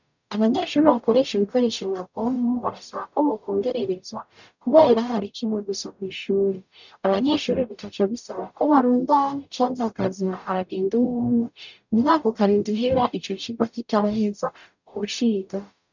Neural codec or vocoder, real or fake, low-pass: codec, 44.1 kHz, 0.9 kbps, DAC; fake; 7.2 kHz